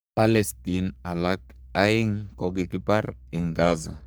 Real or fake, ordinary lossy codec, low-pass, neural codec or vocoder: fake; none; none; codec, 44.1 kHz, 3.4 kbps, Pupu-Codec